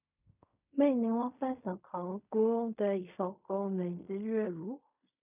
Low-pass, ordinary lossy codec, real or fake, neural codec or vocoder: 3.6 kHz; none; fake; codec, 16 kHz in and 24 kHz out, 0.4 kbps, LongCat-Audio-Codec, fine tuned four codebook decoder